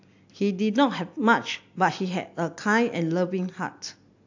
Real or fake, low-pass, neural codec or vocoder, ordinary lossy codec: real; 7.2 kHz; none; AAC, 48 kbps